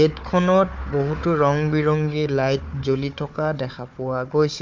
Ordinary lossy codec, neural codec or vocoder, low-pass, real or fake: MP3, 64 kbps; codec, 16 kHz, 4 kbps, FunCodec, trained on Chinese and English, 50 frames a second; 7.2 kHz; fake